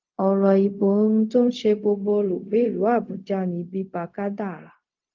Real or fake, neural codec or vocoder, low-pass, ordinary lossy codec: fake; codec, 16 kHz, 0.4 kbps, LongCat-Audio-Codec; 7.2 kHz; Opus, 24 kbps